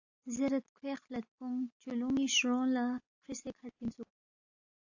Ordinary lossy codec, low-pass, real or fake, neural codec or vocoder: AAC, 48 kbps; 7.2 kHz; real; none